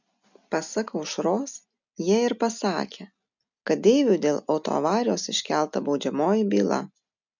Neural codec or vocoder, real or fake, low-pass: none; real; 7.2 kHz